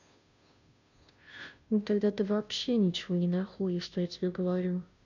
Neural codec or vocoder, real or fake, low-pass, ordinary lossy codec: codec, 16 kHz, 0.5 kbps, FunCodec, trained on Chinese and English, 25 frames a second; fake; 7.2 kHz; none